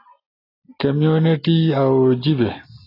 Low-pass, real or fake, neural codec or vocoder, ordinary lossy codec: 5.4 kHz; real; none; AAC, 24 kbps